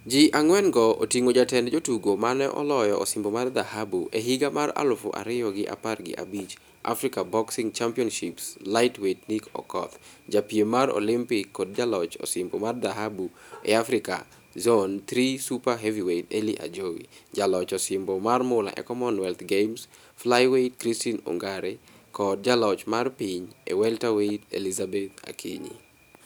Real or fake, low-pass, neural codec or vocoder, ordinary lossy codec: real; none; none; none